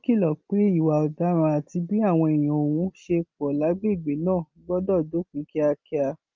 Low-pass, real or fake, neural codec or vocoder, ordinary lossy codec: 7.2 kHz; real; none; Opus, 24 kbps